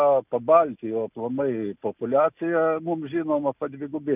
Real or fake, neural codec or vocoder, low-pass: real; none; 3.6 kHz